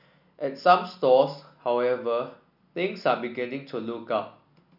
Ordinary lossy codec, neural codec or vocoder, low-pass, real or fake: none; none; 5.4 kHz; real